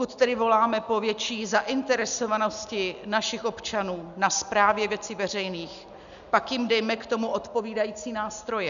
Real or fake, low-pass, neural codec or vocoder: real; 7.2 kHz; none